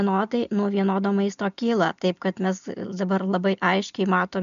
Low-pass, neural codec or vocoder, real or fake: 7.2 kHz; none; real